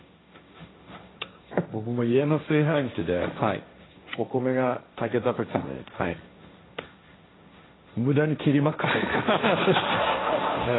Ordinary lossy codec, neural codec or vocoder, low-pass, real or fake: AAC, 16 kbps; codec, 16 kHz, 1.1 kbps, Voila-Tokenizer; 7.2 kHz; fake